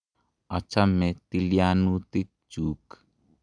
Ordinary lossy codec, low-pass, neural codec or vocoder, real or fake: none; 9.9 kHz; none; real